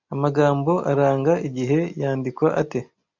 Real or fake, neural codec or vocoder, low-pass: real; none; 7.2 kHz